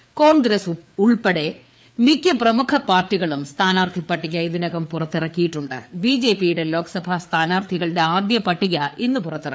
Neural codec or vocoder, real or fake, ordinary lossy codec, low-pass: codec, 16 kHz, 4 kbps, FreqCodec, larger model; fake; none; none